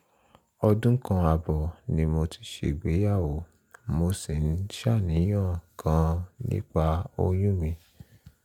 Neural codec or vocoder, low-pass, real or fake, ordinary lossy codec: none; 19.8 kHz; real; MP3, 96 kbps